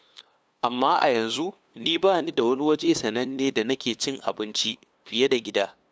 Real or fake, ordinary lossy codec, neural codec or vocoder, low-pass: fake; none; codec, 16 kHz, 2 kbps, FunCodec, trained on LibriTTS, 25 frames a second; none